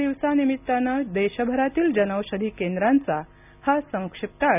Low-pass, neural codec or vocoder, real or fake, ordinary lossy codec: 3.6 kHz; none; real; none